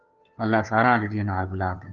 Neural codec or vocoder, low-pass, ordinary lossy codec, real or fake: codec, 16 kHz, 2 kbps, FunCodec, trained on Chinese and English, 25 frames a second; 7.2 kHz; Opus, 24 kbps; fake